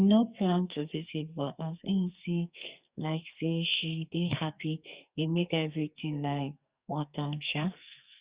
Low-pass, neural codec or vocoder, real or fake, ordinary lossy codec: 3.6 kHz; codec, 44.1 kHz, 2.6 kbps, SNAC; fake; Opus, 64 kbps